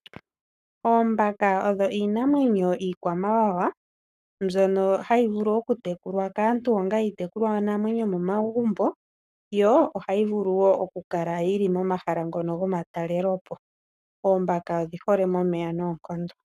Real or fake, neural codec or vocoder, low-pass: fake; codec, 44.1 kHz, 7.8 kbps, Pupu-Codec; 14.4 kHz